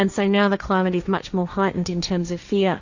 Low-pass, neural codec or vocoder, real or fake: 7.2 kHz; codec, 16 kHz, 1.1 kbps, Voila-Tokenizer; fake